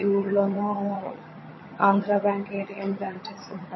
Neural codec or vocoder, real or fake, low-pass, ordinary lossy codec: vocoder, 22.05 kHz, 80 mel bands, HiFi-GAN; fake; 7.2 kHz; MP3, 24 kbps